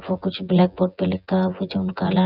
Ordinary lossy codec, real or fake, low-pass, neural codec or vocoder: none; fake; 5.4 kHz; vocoder, 24 kHz, 100 mel bands, Vocos